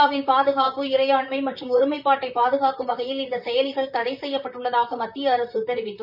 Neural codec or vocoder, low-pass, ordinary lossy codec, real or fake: vocoder, 44.1 kHz, 128 mel bands, Pupu-Vocoder; 5.4 kHz; none; fake